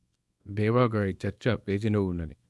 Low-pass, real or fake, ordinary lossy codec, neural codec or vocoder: none; fake; none; codec, 24 kHz, 0.9 kbps, WavTokenizer, small release